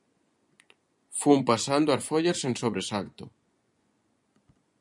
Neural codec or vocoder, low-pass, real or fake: vocoder, 24 kHz, 100 mel bands, Vocos; 10.8 kHz; fake